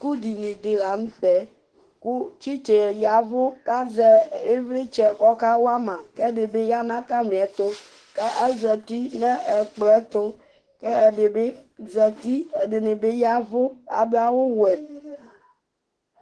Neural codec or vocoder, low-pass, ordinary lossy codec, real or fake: autoencoder, 48 kHz, 32 numbers a frame, DAC-VAE, trained on Japanese speech; 10.8 kHz; Opus, 16 kbps; fake